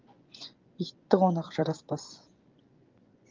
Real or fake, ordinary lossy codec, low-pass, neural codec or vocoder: fake; Opus, 24 kbps; 7.2 kHz; vocoder, 24 kHz, 100 mel bands, Vocos